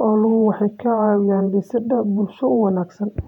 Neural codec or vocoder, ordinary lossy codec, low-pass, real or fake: vocoder, 48 kHz, 128 mel bands, Vocos; none; 19.8 kHz; fake